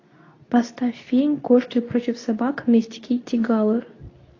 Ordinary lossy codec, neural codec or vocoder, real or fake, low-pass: AAC, 32 kbps; codec, 24 kHz, 0.9 kbps, WavTokenizer, medium speech release version 2; fake; 7.2 kHz